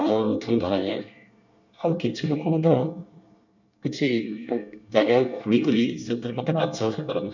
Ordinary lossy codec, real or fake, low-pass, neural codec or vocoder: none; fake; 7.2 kHz; codec, 24 kHz, 1 kbps, SNAC